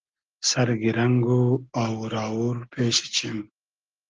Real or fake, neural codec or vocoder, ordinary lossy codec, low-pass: real; none; Opus, 16 kbps; 7.2 kHz